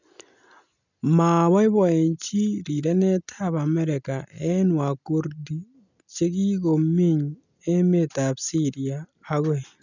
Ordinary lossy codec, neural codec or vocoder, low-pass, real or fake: none; none; 7.2 kHz; real